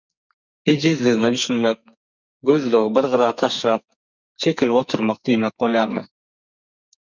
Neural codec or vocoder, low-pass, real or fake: codec, 44.1 kHz, 2.6 kbps, SNAC; 7.2 kHz; fake